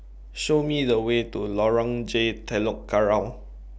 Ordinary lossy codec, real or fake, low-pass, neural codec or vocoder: none; real; none; none